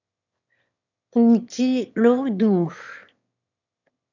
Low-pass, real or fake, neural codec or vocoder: 7.2 kHz; fake; autoencoder, 22.05 kHz, a latent of 192 numbers a frame, VITS, trained on one speaker